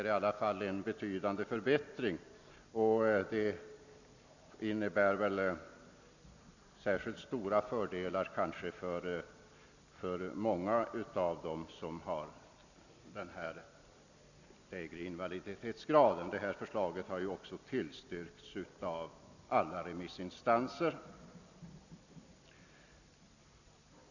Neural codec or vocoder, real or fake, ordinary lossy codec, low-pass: none; real; none; 7.2 kHz